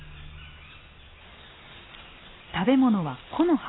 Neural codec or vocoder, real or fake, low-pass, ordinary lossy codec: none; real; 7.2 kHz; AAC, 16 kbps